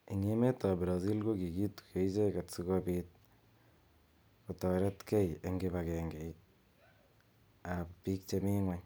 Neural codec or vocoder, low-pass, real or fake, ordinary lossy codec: none; none; real; none